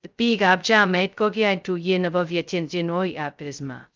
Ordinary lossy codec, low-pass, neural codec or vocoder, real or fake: Opus, 24 kbps; 7.2 kHz; codec, 16 kHz, 0.2 kbps, FocalCodec; fake